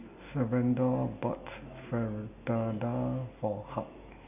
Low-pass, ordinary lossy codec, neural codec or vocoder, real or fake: 3.6 kHz; none; none; real